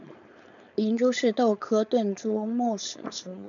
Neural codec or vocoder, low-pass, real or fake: codec, 16 kHz, 4.8 kbps, FACodec; 7.2 kHz; fake